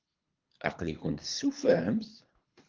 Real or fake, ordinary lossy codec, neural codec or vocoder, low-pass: fake; Opus, 32 kbps; codec, 24 kHz, 3 kbps, HILCodec; 7.2 kHz